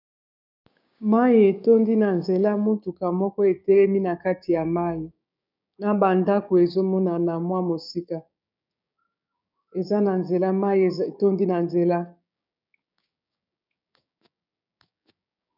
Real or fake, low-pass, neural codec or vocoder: fake; 5.4 kHz; codec, 16 kHz, 6 kbps, DAC